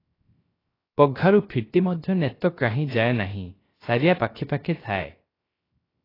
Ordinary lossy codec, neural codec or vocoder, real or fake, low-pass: AAC, 24 kbps; codec, 16 kHz, 0.3 kbps, FocalCodec; fake; 5.4 kHz